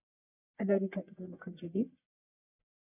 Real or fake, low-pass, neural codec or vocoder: fake; 3.6 kHz; codec, 44.1 kHz, 1.7 kbps, Pupu-Codec